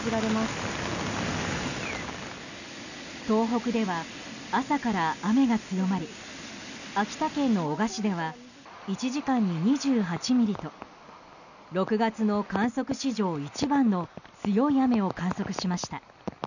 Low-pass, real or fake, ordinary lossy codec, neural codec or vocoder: 7.2 kHz; real; none; none